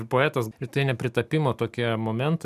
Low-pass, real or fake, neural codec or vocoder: 14.4 kHz; real; none